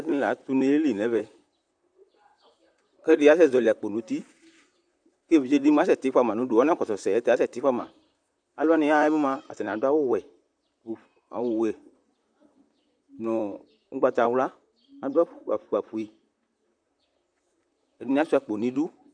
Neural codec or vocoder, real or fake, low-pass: vocoder, 44.1 kHz, 128 mel bands, Pupu-Vocoder; fake; 9.9 kHz